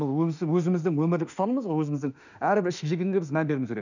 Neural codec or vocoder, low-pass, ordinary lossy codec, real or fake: autoencoder, 48 kHz, 32 numbers a frame, DAC-VAE, trained on Japanese speech; 7.2 kHz; none; fake